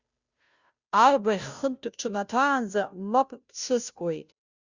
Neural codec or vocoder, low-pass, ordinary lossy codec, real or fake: codec, 16 kHz, 0.5 kbps, FunCodec, trained on Chinese and English, 25 frames a second; 7.2 kHz; Opus, 64 kbps; fake